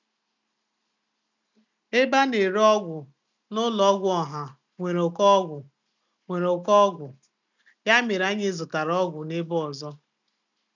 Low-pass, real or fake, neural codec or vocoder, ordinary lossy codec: 7.2 kHz; real; none; none